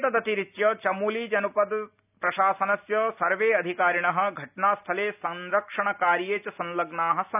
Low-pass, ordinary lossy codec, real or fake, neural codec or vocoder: 3.6 kHz; none; real; none